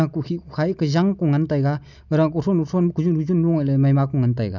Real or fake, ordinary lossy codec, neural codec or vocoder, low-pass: real; none; none; 7.2 kHz